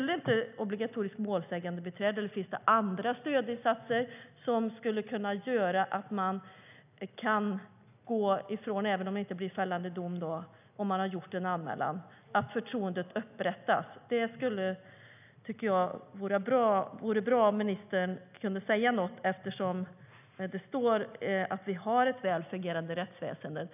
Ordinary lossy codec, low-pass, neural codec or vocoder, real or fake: none; 3.6 kHz; none; real